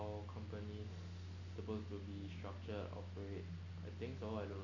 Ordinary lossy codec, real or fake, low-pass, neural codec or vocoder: none; real; 7.2 kHz; none